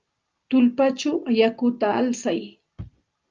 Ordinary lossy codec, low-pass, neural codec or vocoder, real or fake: Opus, 24 kbps; 7.2 kHz; none; real